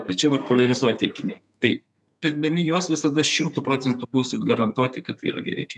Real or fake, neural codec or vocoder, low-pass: fake; codec, 32 kHz, 1.9 kbps, SNAC; 10.8 kHz